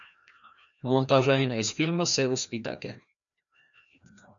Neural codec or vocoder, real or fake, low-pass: codec, 16 kHz, 1 kbps, FreqCodec, larger model; fake; 7.2 kHz